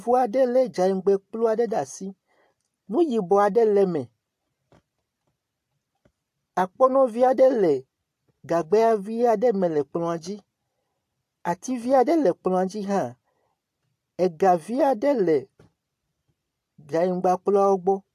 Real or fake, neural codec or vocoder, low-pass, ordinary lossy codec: real; none; 14.4 kHz; AAC, 64 kbps